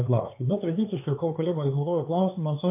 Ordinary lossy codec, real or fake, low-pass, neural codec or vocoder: MP3, 24 kbps; fake; 3.6 kHz; codec, 16 kHz, 4 kbps, X-Codec, HuBERT features, trained on LibriSpeech